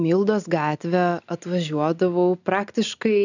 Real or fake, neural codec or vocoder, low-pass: real; none; 7.2 kHz